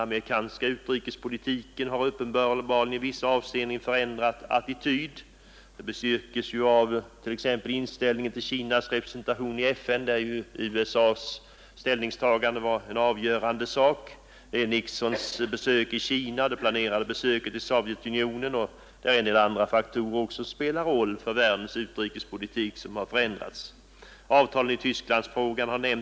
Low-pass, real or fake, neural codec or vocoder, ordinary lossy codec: none; real; none; none